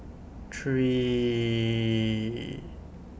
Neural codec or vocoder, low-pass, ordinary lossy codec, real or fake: none; none; none; real